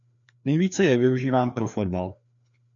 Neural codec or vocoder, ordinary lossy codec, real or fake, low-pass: codec, 16 kHz, 2 kbps, FreqCodec, larger model; MP3, 96 kbps; fake; 7.2 kHz